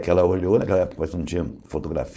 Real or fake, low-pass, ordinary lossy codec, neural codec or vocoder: fake; none; none; codec, 16 kHz, 4.8 kbps, FACodec